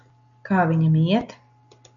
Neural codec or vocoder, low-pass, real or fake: none; 7.2 kHz; real